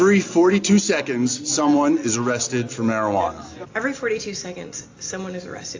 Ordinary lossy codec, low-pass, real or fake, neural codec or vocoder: AAC, 48 kbps; 7.2 kHz; real; none